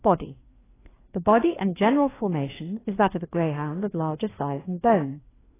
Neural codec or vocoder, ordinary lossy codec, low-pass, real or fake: codec, 16 kHz, 2 kbps, FreqCodec, larger model; AAC, 16 kbps; 3.6 kHz; fake